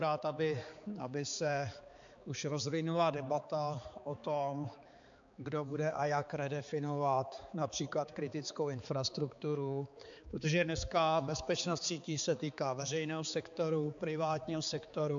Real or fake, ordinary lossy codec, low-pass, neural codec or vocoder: fake; AAC, 64 kbps; 7.2 kHz; codec, 16 kHz, 4 kbps, X-Codec, HuBERT features, trained on balanced general audio